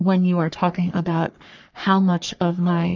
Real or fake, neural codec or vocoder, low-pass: fake; codec, 44.1 kHz, 3.4 kbps, Pupu-Codec; 7.2 kHz